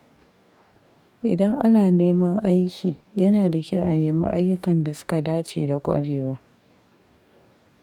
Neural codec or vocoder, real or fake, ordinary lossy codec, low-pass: codec, 44.1 kHz, 2.6 kbps, DAC; fake; none; 19.8 kHz